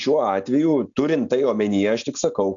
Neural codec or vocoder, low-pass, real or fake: none; 7.2 kHz; real